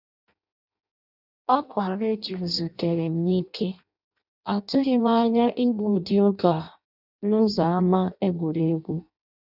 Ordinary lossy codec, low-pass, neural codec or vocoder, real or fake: none; 5.4 kHz; codec, 16 kHz in and 24 kHz out, 0.6 kbps, FireRedTTS-2 codec; fake